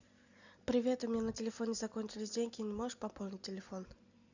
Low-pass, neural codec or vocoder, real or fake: 7.2 kHz; none; real